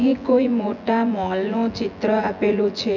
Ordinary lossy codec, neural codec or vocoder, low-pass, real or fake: none; vocoder, 24 kHz, 100 mel bands, Vocos; 7.2 kHz; fake